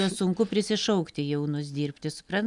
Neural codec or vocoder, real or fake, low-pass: none; real; 10.8 kHz